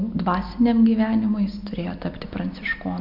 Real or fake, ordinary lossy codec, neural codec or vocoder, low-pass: real; MP3, 48 kbps; none; 5.4 kHz